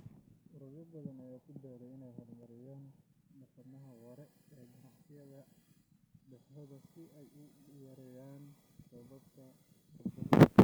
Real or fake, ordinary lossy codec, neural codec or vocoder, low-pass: real; none; none; none